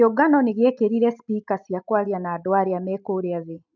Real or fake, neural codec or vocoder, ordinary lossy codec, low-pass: real; none; none; 7.2 kHz